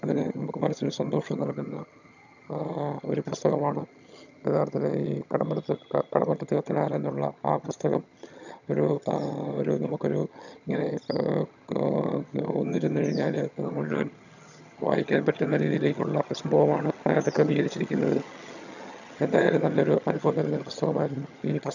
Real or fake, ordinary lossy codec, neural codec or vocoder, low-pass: fake; none; vocoder, 22.05 kHz, 80 mel bands, HiFi-GAN; 7.2 kHz